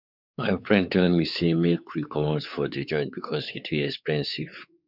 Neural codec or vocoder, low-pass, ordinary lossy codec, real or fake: codec, 16 kHz, 4 kbps, X-Codec, WavLM features, trained on Multilingual LibriSpeech; 5.4 kHz; none; fake